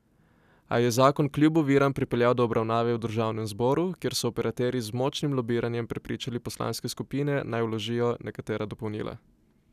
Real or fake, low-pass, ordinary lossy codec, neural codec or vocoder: real; 14.4 kHz; none; none